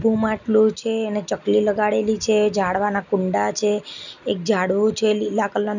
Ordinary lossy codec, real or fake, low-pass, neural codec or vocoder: none; real; 7.2 kHz; none